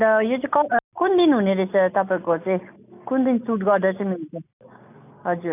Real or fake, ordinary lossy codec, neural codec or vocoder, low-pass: real; none; none; 3.6 kHz